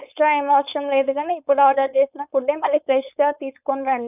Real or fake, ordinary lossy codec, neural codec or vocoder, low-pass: fake; none; codec, 16 kHz, 4.8 kbps, FACodec; 3.6 kHz